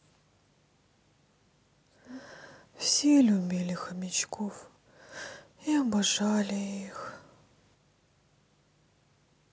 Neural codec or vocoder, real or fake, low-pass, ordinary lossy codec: none; real; none; none